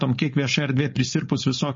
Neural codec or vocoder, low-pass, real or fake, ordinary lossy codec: codec, 16 kHz, 4.8 kbps, FACodec; 7.2 kHz; fake; MP3, 32 kbps